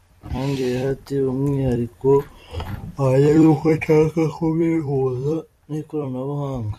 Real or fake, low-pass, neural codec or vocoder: fake; 14.4 kHz; vocoder, 44.1 kHz, 128 mel bands every 256 samples, BigVGAN v2